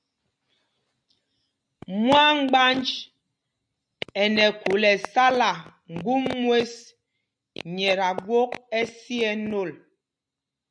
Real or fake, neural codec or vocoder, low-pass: real; none; 9.9 kHz